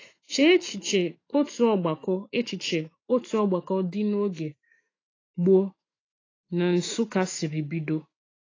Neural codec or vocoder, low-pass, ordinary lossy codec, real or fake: autoencoder, 48 kHz, 128 numbers a frame, DAC-VAE, trained on Japanese speech; 7.2 kHz; AAC, 32 kbps; fake